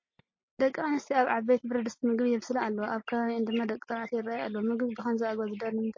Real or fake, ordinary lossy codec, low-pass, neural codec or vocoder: real; MP3, 32 kbps; 7.2 kHz; none